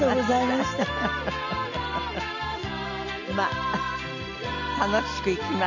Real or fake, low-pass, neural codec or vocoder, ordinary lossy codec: real; 7.2 kHz; none; none